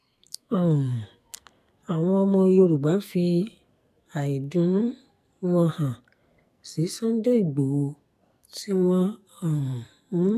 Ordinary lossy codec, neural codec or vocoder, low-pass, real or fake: none; codec, 44.1 kHz, 2.6 kbps, SNAC; 14.4 kHz; fake